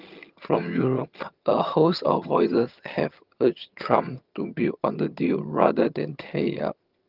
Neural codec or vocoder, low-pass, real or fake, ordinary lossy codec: vocoder, 22.05 kHz, 80 mel bands, HiFi-GAN; 5.4 kHz; fake; Opus, 32 kbps